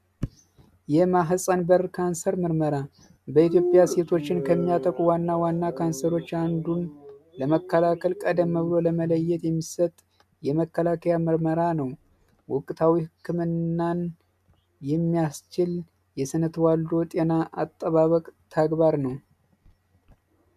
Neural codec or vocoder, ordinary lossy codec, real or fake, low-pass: none; MP3, 96 kbps; real; 14.4 kHz